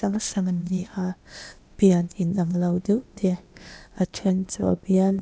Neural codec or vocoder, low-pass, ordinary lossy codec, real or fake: codec, 16 kHz, 0.8 kbps, ZipCodec; none; none; fake